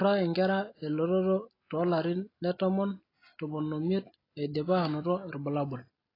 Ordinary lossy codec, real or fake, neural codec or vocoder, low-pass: AAC, 24 kbps; real; none; 5.4 kHz